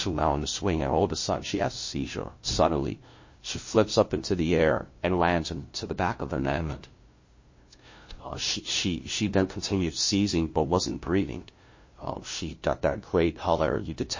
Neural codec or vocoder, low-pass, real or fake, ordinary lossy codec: codec, 16 kHz, 0.5 kbps, FunCodec, trained on LibriTTS, 25 frames a second; 7.2 kHz; fake; MP3, 32 kbps